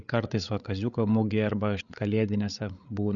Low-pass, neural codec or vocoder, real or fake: 7.2 kHz; codec, 16 kHz, 8 kbps, FreqCodec, larger model; fake